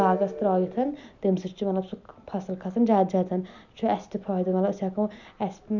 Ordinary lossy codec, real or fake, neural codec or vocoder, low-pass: none; real; none; 7.2 kHz